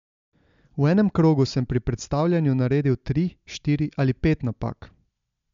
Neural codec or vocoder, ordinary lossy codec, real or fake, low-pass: none; MP3, 64 kbps; real; 7.2 kHz